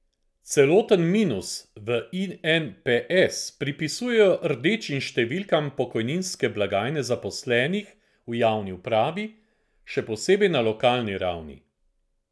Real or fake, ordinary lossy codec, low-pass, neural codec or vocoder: real; none; none; none